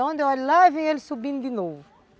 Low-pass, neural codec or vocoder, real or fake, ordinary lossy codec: none; none; real; none